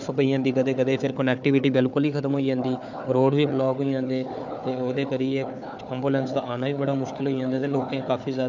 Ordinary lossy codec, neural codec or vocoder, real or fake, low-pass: none; codec, 16 kHz, 4 kbps, FunCodec, trained on Chinese and English, 50 frames a second; fake; 7.2 kHz